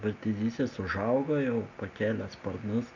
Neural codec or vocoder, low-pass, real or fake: none; 7.2 kHz; real